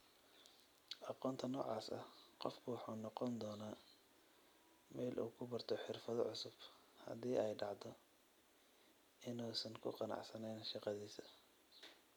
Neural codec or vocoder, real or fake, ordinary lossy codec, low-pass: none; real; none; none